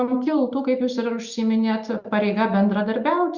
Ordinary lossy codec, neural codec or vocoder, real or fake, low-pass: Opus, 64 kbps; none; real; 7.2 kHz